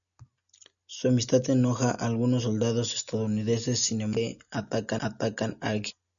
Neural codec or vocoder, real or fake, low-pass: none; real; 7.2 kHz